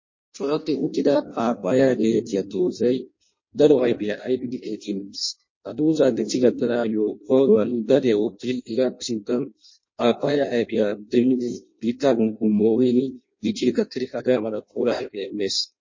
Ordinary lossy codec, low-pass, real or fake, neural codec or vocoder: MP3, 32 kbps; 7.2 kHz; fake; codec, 16 kHz in and 24 kHz out, 0.6 kbps, FireRedTTS-2 codec